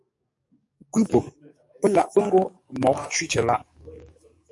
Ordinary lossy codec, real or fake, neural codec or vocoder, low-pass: MP3, 48 kbps; fake; codec, 44.1 kHz, 7.8 kbps, DAC; 10.8 kHz